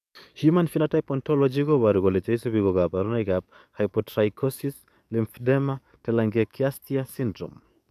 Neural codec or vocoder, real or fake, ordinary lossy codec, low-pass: codec, 44.1 kHz, 7.8 kbps, DAC; fake; none; 14.4 kHz